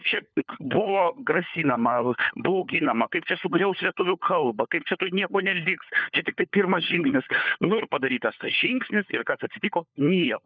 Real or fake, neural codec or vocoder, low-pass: fake; codec, 16 kHz, 4 kbps, FunCodec, trained on LibriTTS, 50 frames a second; 7.2 kHz